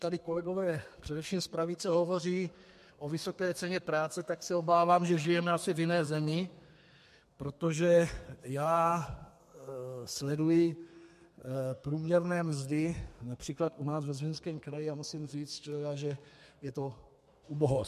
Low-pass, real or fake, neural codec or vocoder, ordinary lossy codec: 14.4 kHz; fake; codec, 32 kHz, 1.9 kbps, SNAC; MP3, 64 kbps